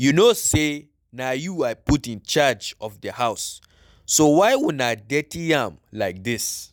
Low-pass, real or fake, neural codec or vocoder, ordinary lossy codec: 19.8 kHz; real; none; none